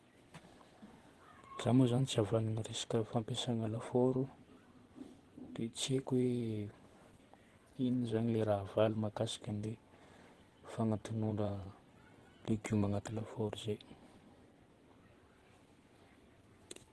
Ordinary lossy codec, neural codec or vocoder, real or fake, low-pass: Opus, 16 kbps; vocoder, 24 kHz, 100 mel bands, Vocos; fake; 10.8 kHz